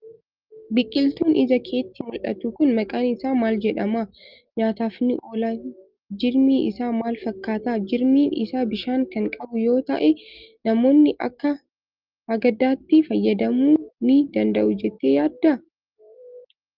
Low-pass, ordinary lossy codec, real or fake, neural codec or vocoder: 5.4 kHz; Opus, 32 kbps; real; none